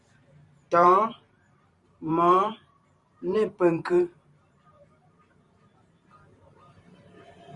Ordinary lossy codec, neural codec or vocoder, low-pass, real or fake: Opus, 64 kbps; vocoder, 44.1 kHz, 128 mel bands every 512 samples, BigVGAN v2; 10.8 kHz; fake